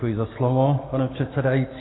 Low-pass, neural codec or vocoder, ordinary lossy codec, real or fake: 7.2 kHz; none; AAC, 16 kbps; real